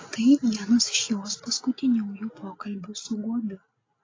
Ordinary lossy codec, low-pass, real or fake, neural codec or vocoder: AAC, 32 kbps; 7.2 kHz; real; none